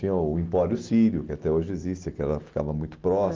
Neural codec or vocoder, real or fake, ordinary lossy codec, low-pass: none; real; Opus, 16 kbps; 7.2 kHz